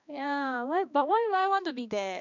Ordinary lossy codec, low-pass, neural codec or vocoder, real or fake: none; 7.2 kHz; codec, 16 kHz, 2 kbps, X-Codec, HuBERT features, trained on balanced general audio; fake